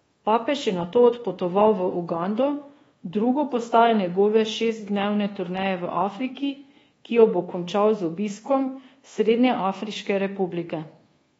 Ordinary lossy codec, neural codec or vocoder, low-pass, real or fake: AAC, 24 kbps; codec, 24 kHz, 1.2 kbps, DualCodec; 10.8 kHz; fake